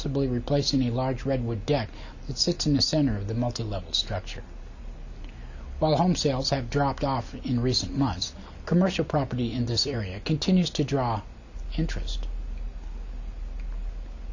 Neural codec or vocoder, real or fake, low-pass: none; real; 7.2 kHz